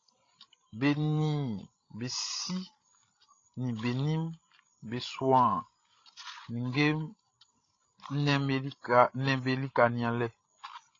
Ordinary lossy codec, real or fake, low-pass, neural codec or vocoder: AAC, 32 kbps; fake; 7.2 kHz; codec, 16 kHz, 16 kbps, FreqCodec, larger model